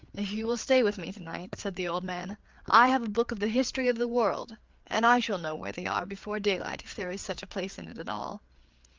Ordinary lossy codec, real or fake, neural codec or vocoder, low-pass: Opus, 24 kbps; fake; codec, 16 kHz, 4 kbps, FreqCodec, larger model; 7.2 kHz